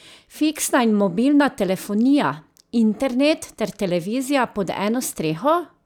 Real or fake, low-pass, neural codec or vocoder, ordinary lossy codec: real; 19.8 kHz; none; none